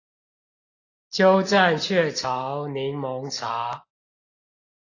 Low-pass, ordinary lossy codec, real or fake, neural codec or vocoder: 7.2 kHz; AAC, 32 kbps; real; none